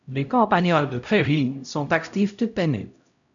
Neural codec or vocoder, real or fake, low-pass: codec, 16 kHz, 0.5 kbps, X-Codec, HuBERT features, trained on LibriSpeech; fake; 7.2 kHz